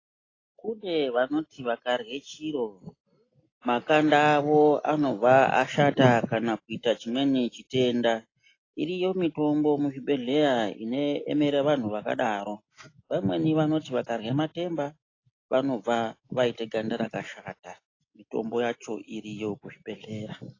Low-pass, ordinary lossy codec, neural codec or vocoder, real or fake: 7.2 kHz; AAC, 32 kbps; none; real